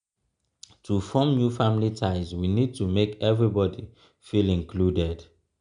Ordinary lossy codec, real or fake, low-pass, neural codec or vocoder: none; real; 9.9 kHz; none